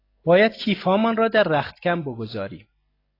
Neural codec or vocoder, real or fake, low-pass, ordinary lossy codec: none; real; 5.4 kHz; AAC, 24 kbps